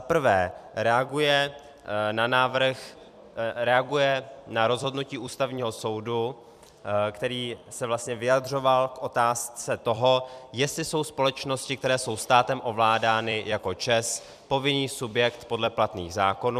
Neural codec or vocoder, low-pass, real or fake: vocoder, 44.1 kHz, 128 mel bands every 256 samples, BigVGAN v2; 14.4 kHz; fake